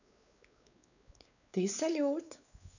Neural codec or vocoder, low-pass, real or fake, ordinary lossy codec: codec, 16 kHz, 4 kbps, X-Codec, WavLM features, trained on Multilingual LibriSpeech; 7.2 kHz; fake; none